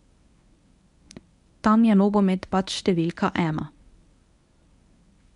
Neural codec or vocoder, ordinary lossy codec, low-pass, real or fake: codec, 24 kHz, 0.9 kbps, WavTokenizer, medium speech release version 1; none; 10.8 kHz; fake